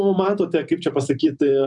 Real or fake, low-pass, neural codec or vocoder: fake; 10.8 kHz; vocoder, 44.1 kHz, 128 mel bands every 256 samples, BigVGAN v2